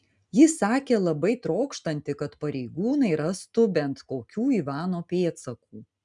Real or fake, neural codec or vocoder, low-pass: real; none; 10.8 kHz